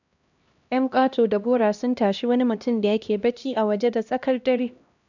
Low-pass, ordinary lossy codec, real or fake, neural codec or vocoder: 7.2 kHz; none; fake; codec, 16 kHz, 1 kbps, X-Codec, HuBERT features, trained on LibriSpeech